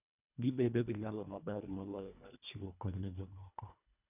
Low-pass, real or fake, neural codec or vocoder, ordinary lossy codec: 3.6 kHz; fake; codec, 24 kHz, 1.5 kbps, HILCodec; none